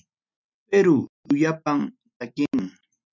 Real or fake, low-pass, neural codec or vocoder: real; 7.2 kHz; none